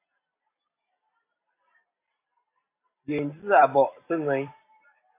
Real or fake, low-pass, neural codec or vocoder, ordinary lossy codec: fake; 3.6 kHz; vocoder, 44.1 kHz, 128 mel bands every 256 samples, BigVGAN v2; AAC, 24 kbps